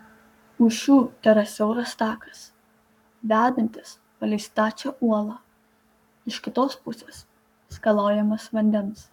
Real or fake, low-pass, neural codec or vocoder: fake; 19.8 kHz; codec, 44.1 kHz, 7.8 kbps, Pupu-Codec